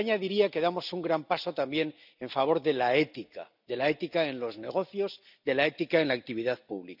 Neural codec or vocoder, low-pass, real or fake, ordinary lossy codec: none; 5.4 kHz; real; none